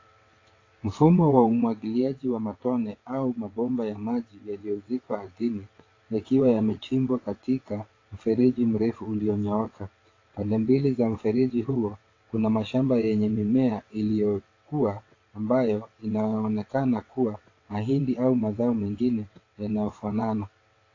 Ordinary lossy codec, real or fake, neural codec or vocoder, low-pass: AAC, 32 kbps; fake; vocoder, 22.05 kHz, 80 mel bands, WaveNeXt; 7.2 kHz